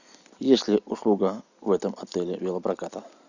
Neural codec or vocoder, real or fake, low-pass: none; real; 7.2 kHz